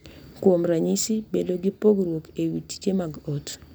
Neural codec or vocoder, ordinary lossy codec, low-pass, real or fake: none; none; none; real